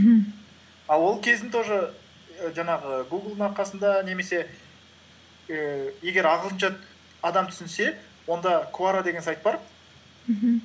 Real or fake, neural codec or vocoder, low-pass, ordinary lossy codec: real; none; none; none